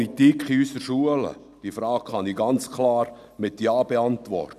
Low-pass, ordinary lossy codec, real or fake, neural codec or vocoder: 14.4 kHz; none; real; none